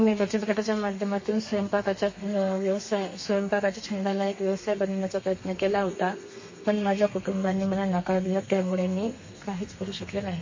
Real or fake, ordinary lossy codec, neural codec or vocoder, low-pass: fake; MP3, 32 kbps; codec, 32 kHz, 1.9 kbps, SNAC; 7.2 kHz